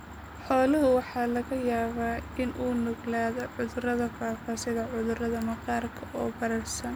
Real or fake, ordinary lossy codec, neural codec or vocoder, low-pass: real; none; none; none